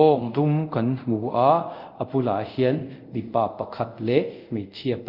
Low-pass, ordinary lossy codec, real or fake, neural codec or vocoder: 5.4 kHz; Opus, 24 kbps; fake; codec, 24 kHz, 0.5 kbps, DualCodec